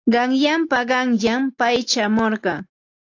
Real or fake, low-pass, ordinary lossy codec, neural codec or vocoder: real; 7.2 kHz; AAC, 48 kbps; none